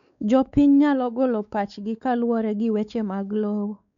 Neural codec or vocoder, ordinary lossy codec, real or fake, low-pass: codec, 16 kHz, 4 kbps, X-Codec, WavLM features, trained on Multilingual LibriSpeech; none; fake; 7.2 kHz